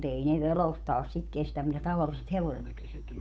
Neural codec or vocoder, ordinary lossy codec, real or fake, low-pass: codec, 16 kHz, 8 kbps, FunCodec, trained on Chinese and English, 25 frames a second; none; fake; none